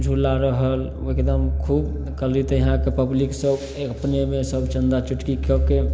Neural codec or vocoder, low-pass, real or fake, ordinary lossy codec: none; none; real; none